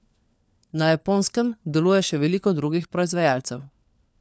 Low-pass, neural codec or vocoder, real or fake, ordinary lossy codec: none; codec, 16 kHz, 4 kbps, FunCodec, trained on LibriTTS, 50 frames a second; fake; none